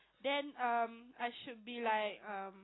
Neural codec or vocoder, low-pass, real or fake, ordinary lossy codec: none; 7.2 kHz; real; AAC, 16 kbps